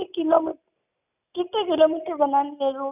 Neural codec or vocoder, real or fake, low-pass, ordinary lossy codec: none; real; 3.6 kHz; none